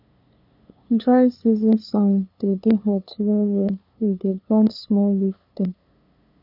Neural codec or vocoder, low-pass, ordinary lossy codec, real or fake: codec, 16 kHz, 2 kbps, FunCodec, trained on LibriTTS, 25 frames a second; 5.4 kHz; none; fake